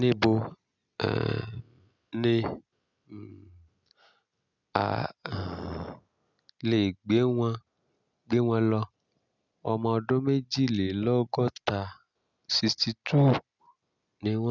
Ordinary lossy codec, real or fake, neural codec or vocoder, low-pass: none; real; none; 7.2 kHz